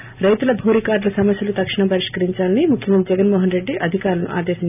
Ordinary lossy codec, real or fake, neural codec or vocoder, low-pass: none; real; none; 3.6 kHz